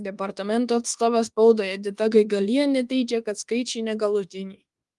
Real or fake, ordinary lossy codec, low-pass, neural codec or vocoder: fake; Opus, 24 kbps; 10.8 kHz; codec, 24 kHz, 1.2 kbps, DualCodec